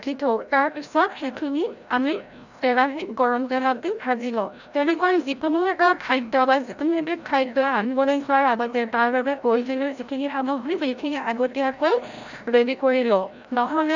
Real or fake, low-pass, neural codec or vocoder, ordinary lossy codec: fake; 7.2 kHz; codec, 16 kHz, 0.5 kbps, FreqCodec, larger model; none